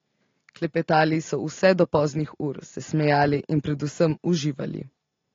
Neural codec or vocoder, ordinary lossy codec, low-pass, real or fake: none; AAC, 32 kbps; 7.2 kHz; real